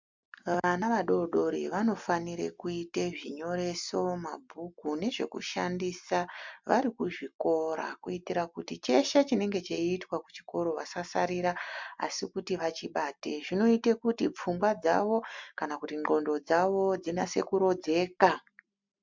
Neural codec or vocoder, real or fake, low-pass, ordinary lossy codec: none; real; 7.2 kHz; MP3, 64 kbps